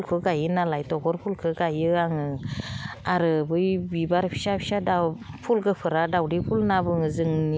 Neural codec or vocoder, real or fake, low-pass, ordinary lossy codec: none; real; none; none